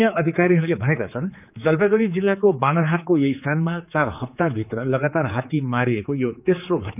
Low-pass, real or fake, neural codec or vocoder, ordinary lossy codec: 3.6 kHz; fake; codec, 16 kHz, 4 kbps, X-Codec, HuBERT features, trained on general audio; none